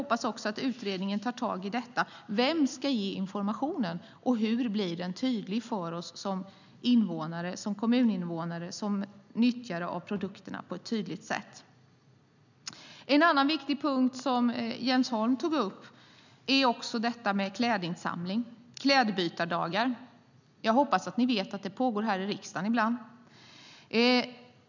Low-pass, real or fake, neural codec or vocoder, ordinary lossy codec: 7.2 kHz; real; none; none